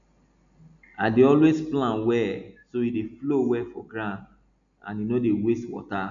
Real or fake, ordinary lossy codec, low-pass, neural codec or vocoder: real; MP3, 96 kbps; 7.2 kHz; none